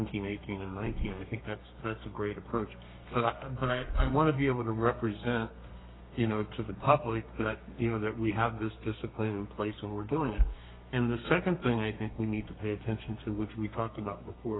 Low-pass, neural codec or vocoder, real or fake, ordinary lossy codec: 7.2 kHz; codec, 32 kHz, 1.9 kbps, SNAC; fake; AAC, 16 kbps